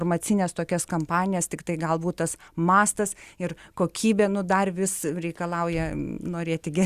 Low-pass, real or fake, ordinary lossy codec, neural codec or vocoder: 14.4 kHz; real; Opus, 64 kbps; none